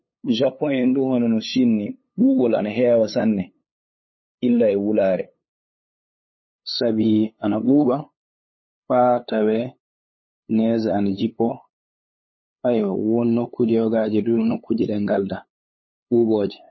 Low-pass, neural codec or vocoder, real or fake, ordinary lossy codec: 7.2 kHz; codec, 16 kHz, 8 kbps, FunCodec, trained on LibriTTS, 25 frames a second; fake; MP3, 24 kbps